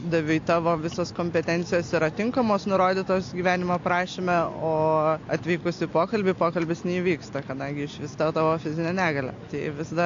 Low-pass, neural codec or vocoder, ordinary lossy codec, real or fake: 7.2 kHz; none; AAC, 48 kbps; real